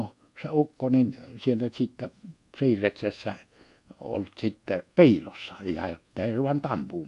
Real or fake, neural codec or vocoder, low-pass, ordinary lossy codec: fake; codec, 24 kHz, 1.2 kbps, DualCodec; 10.8 kHz; AAC, 64 kbps